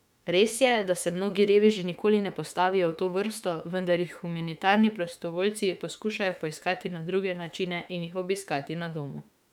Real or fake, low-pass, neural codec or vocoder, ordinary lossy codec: fake; 19.8 kHz; autoencoder, 48 kHz, 32 numbers a frame, DAC-VAE, trained on Japanese speech; none